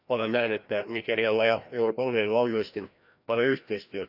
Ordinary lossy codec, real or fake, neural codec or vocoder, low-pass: none; fake; codec, 16 kHz, 1 kbps, FreqCodec, larger model; 5.4 kHz